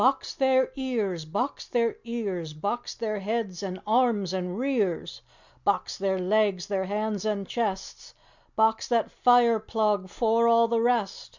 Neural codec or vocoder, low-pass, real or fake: none; 7.2 kHz; real